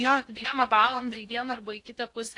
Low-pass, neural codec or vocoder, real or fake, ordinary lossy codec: 10.8 kHz; codec, 16 kHz in and 24 kHz out, 0.6 kbps, FocalCodec, streaming, 2048 codes; fake; AAC, 48 kbps